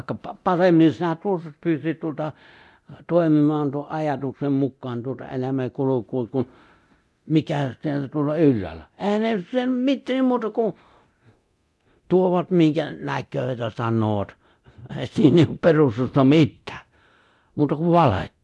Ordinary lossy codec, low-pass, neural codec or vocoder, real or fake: none; none; codec, 24 kHz, 0.9 kbps, DualCodec; fake